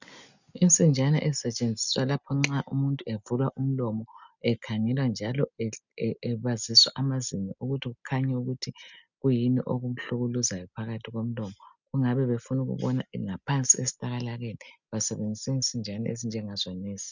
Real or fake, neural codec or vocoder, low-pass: real; none; 7.2 kHz